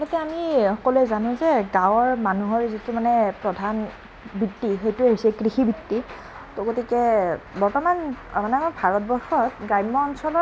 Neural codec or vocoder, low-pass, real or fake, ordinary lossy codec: none; none; real; none